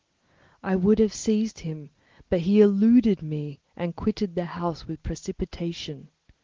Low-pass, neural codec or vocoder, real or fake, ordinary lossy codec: 7.2 kHz; none; real; Opus, 16 kbps